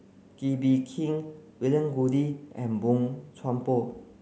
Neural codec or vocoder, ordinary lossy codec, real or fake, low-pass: none; none; real; none